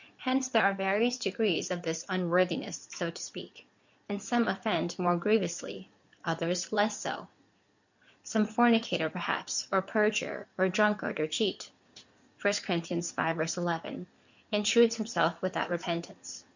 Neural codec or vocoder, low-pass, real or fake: codec, 16 kHz in and 24 kHz out, 2.2 kbps, FireRedTTS-2 codec; 7.2 kHz; fake